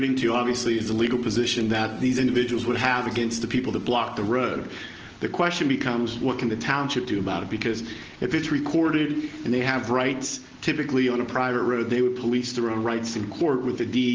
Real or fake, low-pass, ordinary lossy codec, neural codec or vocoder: real; 7.2 kHz; Opus, 16 kbps; none